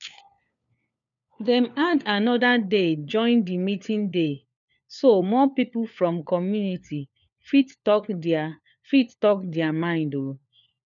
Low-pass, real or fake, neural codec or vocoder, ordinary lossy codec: 7.2 kHz; fake; codec, 16 kHz, 4 kbps, FunCodec, trained on LibriTTS, 50 frames a second; none